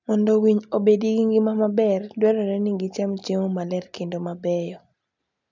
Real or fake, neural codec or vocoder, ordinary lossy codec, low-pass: real; none; none; 7.2 kHz